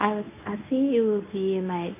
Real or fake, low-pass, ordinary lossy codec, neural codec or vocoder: fake; 3.6 kHz; AAC, 24 kbps; codec, 24 kHz, 0.9 kbps, WavTokenizer, medium speech release version 1